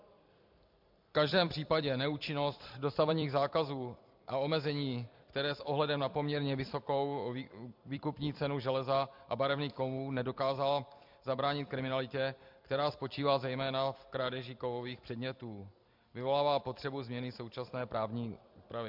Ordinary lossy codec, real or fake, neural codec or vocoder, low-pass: MP3, 48 kbps; fake; vocoder, 44.1 kHz, 128 mel bands every 256 samples, BigVGAN v2; 5.4 kHz